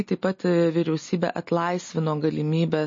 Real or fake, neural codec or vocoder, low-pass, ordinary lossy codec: real; none; 7.2 kHz; MP3, 32 kbps